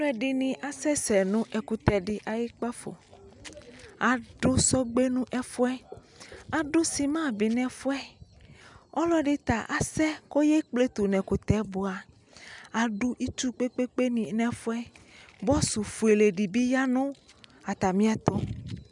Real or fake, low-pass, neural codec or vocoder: real; 10.8 kHz; none